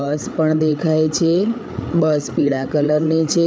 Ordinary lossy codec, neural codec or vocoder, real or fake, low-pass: none; codec, 16 kHz, 8 kbps, FreqCodec, larger model; fake; none